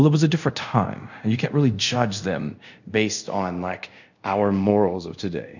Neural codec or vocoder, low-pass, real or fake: codec, 24 kHz, 0.5 kbps, DualCodec; 7.2 kHz; fake